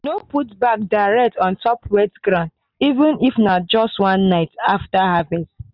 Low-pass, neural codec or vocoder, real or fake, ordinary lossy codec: 5.4 kHz; none; real; none